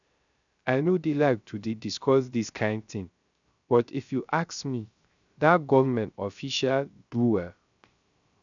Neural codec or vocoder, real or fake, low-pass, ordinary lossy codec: codec, 16 kHz, 0.3 kbps, FocalCodec; fake; 7.2 kHz; none